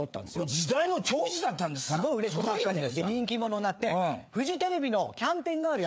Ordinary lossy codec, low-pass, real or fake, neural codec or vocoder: none; none; fake; codec, 16 kHz, 4 kbps, FreqCodec, larger model